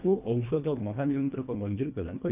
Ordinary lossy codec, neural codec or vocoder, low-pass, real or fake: none; codec, 24 kHz, 1.5 kbps, HILCodec; 3.6 kHz; fake